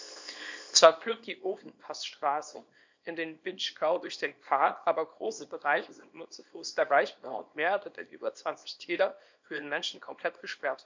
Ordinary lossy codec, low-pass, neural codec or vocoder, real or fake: MP3, 64 kbps; 7.2 kHz; codec, 24 kHz, 0.9 kbps, WavTokenizer, small release; fake